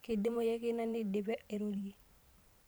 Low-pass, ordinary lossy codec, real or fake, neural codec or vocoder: none; none; fake; vocoder, 44.1 kHz, 128 mel bands every 512 samples, BigVGAN v2